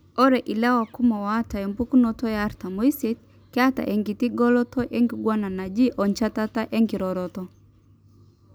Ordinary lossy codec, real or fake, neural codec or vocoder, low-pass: none; real; none; none